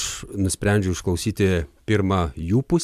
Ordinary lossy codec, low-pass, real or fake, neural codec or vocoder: MP3, 96 kbps; 14.4 kHz; real; none